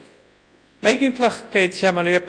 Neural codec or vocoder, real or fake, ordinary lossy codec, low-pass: codec, 24 kHz, 0.9 kbps, WavTokenizer, large speech release; fake; AAC, 48 kbps; 9.9 kHz